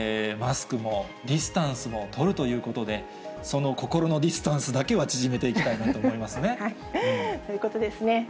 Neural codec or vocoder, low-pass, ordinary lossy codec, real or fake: none; none; none; real